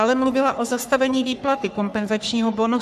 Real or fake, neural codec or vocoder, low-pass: fake; codec, 44.1 kHz, 3.4 kbps, Pupu-Codec; 14.4 kHz